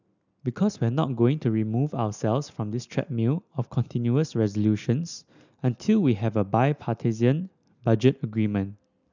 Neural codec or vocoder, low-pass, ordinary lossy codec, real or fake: none; 7.2 kHz; none; real